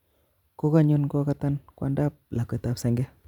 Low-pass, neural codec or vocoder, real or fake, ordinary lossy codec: 19.8 kHz; vocoder, 44.1 kHz, 128 mel bands every 512 samples, BigVGAN v2; fake; none